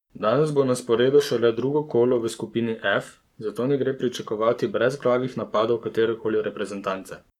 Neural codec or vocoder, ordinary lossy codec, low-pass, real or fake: codec, 44.1 kHz, 7.8 kbps, Pupu-Codec; none; 19.8 kHz; fake